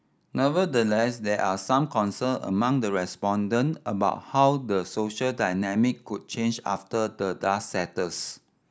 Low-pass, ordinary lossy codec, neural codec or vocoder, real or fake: none; none; none; real